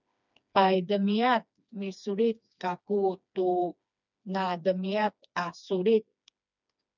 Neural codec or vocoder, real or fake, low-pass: codec, 16 kHz, 2 kbps, FreqCodec, smaller model; fake; 7.2 kHz